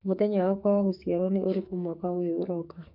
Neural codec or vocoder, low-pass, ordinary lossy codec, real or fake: codec, 44.1 kHz, 2.6 kbps, SNAC; 5.4 kHz; MP3, 48 kbps; fake